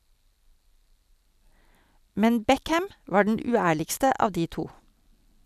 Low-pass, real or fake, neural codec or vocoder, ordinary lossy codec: 14.4 kHz; real; none; none